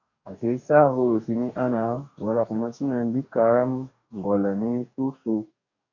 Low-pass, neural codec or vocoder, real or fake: 7.2 kHz; codec, 44.1 kHz, 2.6 kbps, DAC; fake